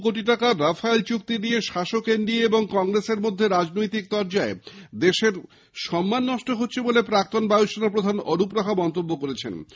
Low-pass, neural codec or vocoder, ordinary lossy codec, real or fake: none; none; none; real